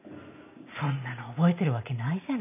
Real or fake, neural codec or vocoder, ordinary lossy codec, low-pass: real; none; none; 3.6 kHz